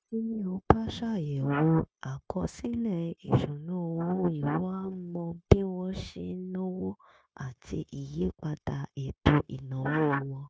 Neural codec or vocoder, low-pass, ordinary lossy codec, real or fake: codec, 16 kHz, 0.9 kbps, LongCat-Audio-Codec; none; none; fake